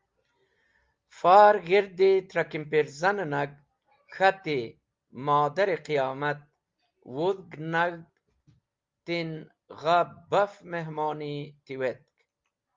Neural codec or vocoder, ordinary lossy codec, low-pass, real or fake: none; Opus, 32 kbps; 7.2 kHz; real